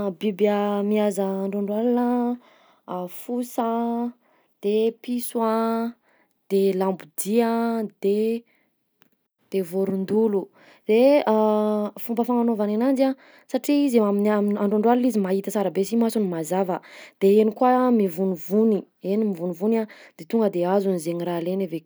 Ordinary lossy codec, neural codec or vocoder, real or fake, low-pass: none; none; real; none